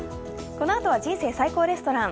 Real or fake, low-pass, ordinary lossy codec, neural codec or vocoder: real; none; none; none